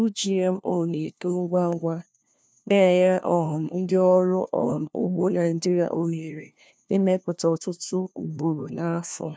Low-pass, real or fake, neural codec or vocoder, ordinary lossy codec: none; fake; codec, 16 kHz, 1 kbps, FunCodec, trained on LibriTTS, 50 frames a second; none